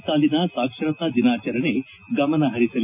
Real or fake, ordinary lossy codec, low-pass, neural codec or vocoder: real; none; 3.6 kHz; none